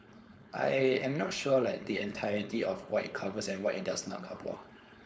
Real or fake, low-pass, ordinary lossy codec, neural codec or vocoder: fake; none; none; codec, 16 kHz, 4.8 kbps, FACodec